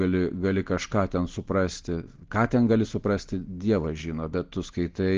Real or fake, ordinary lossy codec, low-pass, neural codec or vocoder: real; Opus, 16 kbps; 7.2 kHz; none